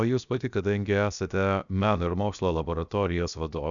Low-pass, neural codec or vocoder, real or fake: 7.2 kHz; codec, 16 kHz, 0.7 kbps, FocalCodec; fake